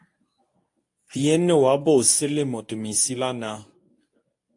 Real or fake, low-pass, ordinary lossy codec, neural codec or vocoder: fake; 10.8 kHz; AAC, 64 kbps; codec, 24 kHz, 0.9 kbps, WavTokenizer, medium speech release version 1